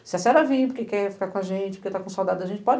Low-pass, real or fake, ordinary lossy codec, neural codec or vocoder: none; real; none; none